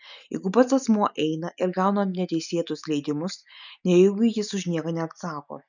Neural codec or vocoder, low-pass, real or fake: none; 7.2 kHz; real